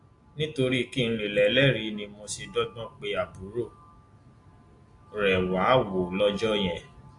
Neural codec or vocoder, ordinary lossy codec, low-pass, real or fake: none; AAC, 48 kbps; 10.8 kHz; real